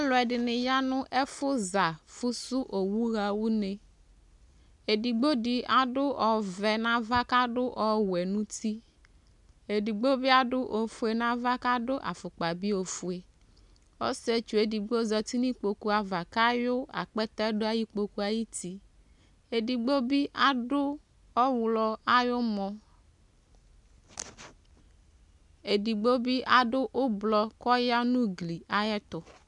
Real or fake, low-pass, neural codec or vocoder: real; 10.8 kHz; none